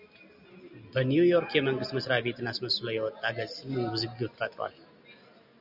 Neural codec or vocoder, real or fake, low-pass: none; real; 5.4 kHz